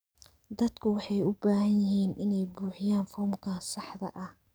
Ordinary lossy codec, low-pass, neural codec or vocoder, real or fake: none; none; codec, 44.1 kHz, 7.8 kbps, DAC; fake